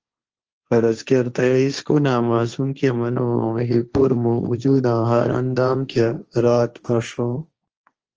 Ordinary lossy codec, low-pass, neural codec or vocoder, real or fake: Opus, 24 kbps; 7.2 kHz; codec, 16 kHz, 1.1 kbps, Voila-Tokenizer; fake